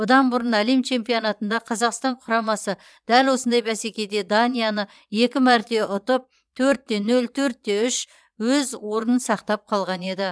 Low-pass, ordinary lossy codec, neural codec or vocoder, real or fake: none; none; vocoder, 22.05 kHz, 80 mel bands, Vocos; fake